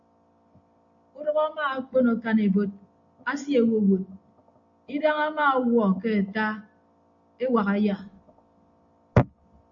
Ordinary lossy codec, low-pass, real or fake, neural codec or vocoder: AAC, 64 kbps; 7.2 kHz; real; none